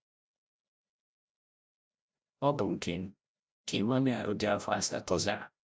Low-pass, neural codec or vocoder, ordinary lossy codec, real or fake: none; codec, 16 kHz, 0.5 kbps, FreqCodec, larger model; none; fake